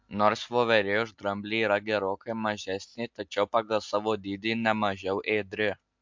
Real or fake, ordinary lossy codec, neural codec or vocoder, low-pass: real; MP3, 64 kbps; none; 7.2 kHz